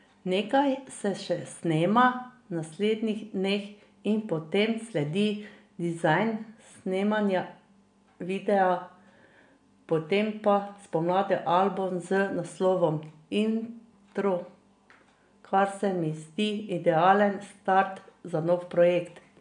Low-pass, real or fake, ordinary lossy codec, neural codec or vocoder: 9.9 kHz; real; MP3, 64 kbps; none